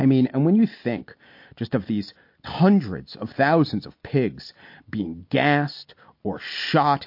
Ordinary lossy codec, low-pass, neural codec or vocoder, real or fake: MP3, 32 kbps; 5.4 kHz; none; real